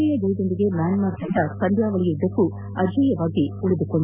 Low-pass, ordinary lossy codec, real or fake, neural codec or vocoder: 3.6 kHz; none; real; none